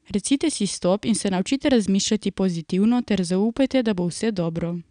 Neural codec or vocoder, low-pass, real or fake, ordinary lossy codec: none; 9.9 kHz; real; none